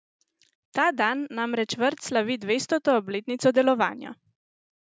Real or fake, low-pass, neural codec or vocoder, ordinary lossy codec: real; none; none; none